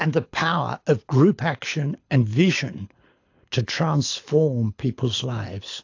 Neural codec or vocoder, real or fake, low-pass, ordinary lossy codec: codec, 24 kHz, 6 kbps, HILCodec; fake; 7.2 kHz; AAC, 48 kbps